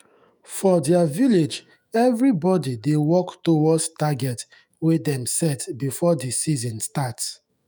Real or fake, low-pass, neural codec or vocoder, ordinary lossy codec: fake; none; autoencoder, 48 kHz, 128 numbers a frame, DAC-VAE, trained on Japanese speech; none